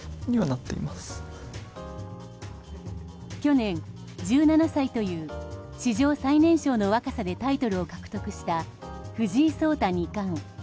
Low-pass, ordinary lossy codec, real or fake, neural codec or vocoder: none; none; real; none